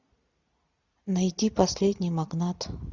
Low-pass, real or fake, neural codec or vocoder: 7.2 kHz; real; none